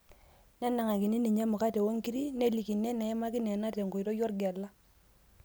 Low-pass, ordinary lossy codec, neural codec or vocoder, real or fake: none; none; none; real